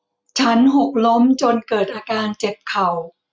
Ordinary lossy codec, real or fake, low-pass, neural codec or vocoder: none; real; none; none